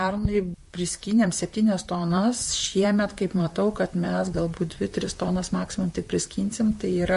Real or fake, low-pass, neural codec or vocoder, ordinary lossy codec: fake; 14.4 kHz; vocoder, 44.1 kHz, 128 mel bands, Pupu-Vocoder; MP3, 48 kbps